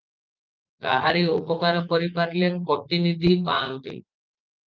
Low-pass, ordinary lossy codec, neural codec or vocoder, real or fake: 7.2 kHz; Opus, 32 kbps; vocoder, 44.1 kHz, 80 mel bands, Vocos; fake